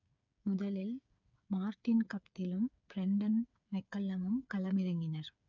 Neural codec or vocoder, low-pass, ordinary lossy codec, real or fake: codec, 16 kHz, 8 kbps, FreqCodec, smaller model; 7.2 kHz; none; fake